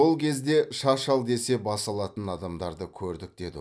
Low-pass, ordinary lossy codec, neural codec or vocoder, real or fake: none; none; none; real